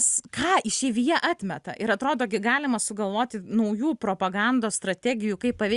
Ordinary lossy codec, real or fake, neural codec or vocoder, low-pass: Opus, 64 kbps; real; none; 10.8 kHz